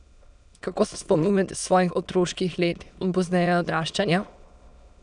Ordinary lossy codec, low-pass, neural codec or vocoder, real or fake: none; 9.9 kHz; autoencoder, 22.05 kHz, a latent of 192 numbers a frame, VITS, trained on many speakers; fake